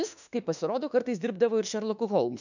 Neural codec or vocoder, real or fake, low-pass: autoencoder, 48 kHz, 32 numbers a frame, DAC-VAE, trained on Japanese speech; fake; 7.2 kHz